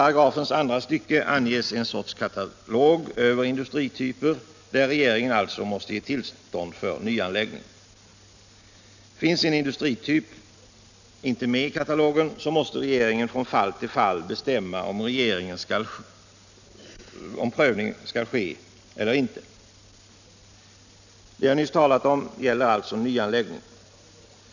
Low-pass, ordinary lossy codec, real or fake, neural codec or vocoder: 7.2 kHz; none; real; none